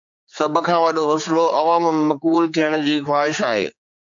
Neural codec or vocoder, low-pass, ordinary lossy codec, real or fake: codec, 16 kHz, 4 kbps, X-Codec, HuBERT features, trained on general audio; 7.2 kHz; MP3, 64 kbps; fake